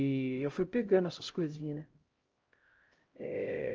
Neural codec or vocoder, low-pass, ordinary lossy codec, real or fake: codec, 16 kHz, 0.5 kbps, X-Codec, HuBERT features, trained on LibriSpeech; 7.2 kHz; Opus, 24 kbps; fake